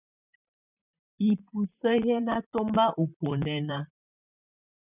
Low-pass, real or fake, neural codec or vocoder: 3.6 kHz; fake; vocoder, 44.1 kHz, 128 mel bands, Pupu-Vocoder